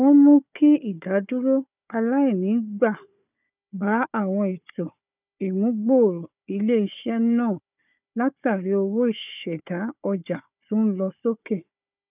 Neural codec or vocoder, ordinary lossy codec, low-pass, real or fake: codec, 16 kHz, 4 kbps, FunCodec, trained on Chinese and English, 50 frames a second; none; 3.6 kHz; fake